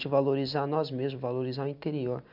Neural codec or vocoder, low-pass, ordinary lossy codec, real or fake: none; 5.4 kHz; none; real